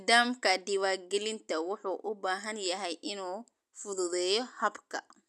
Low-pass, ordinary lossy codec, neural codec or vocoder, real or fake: none; none; none; real